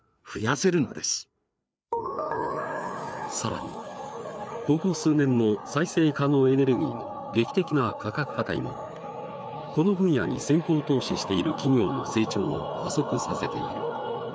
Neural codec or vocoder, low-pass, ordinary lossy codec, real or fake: codec, 16 kHz, 4 kbps, FreqCodec, larger model; none; none; fake